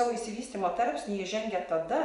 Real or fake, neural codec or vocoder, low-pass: fake; vocoder, 24 kHz, 100 mel bands, Vocos; 10.8 kHz